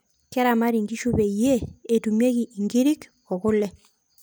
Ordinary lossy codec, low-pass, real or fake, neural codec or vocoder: none; none; real; none